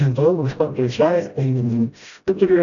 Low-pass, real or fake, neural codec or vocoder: 7.2 kHz; fake; codec, 16 kHz, 0.5 kbps, FreqCodec, smaller model